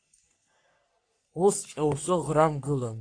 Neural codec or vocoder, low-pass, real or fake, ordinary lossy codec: codec, 44.1 kHz, 3.4 kbps, Pupu-Codec; 9.9 kHz; fake; AAC, 48 kbps